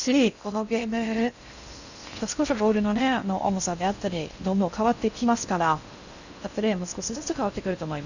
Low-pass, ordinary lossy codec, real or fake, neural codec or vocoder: 7.2 kHz; none; fake; codec, 16 kHz in and 24 kHz out, 0.6 kbps, FocalCodec, streaming, 4096 codes